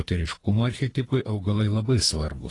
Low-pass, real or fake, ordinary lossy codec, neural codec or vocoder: 10.8 kHz; fake; AAC, 32 kbps; codec, 24 kHz, 3 kbps, HILCodec